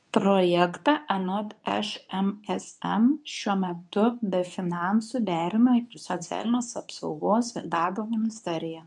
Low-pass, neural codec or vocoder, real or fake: 10.8 kHz; codec, 24 kHz, 0.9 kbps, WavTokenizer, medium speech release version 2; fake